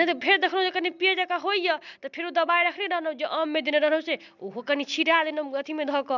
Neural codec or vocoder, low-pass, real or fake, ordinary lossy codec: none; 7.2 kHz; real; none